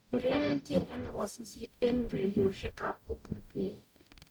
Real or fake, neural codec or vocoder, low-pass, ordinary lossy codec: fake; codec, 44.1 kHz, 0.9 kbps, DAC; none; none